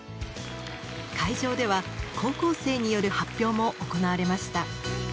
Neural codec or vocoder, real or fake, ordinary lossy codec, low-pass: none; real; none; none